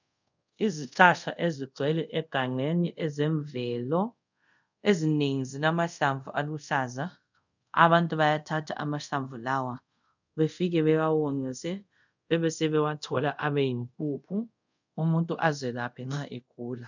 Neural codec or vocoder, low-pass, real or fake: codec, 24 kHz, 0.5 kbps, DualCodec; 7.2 kHz; fake